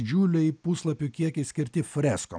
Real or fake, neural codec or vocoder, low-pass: real; none; 9.9 kHz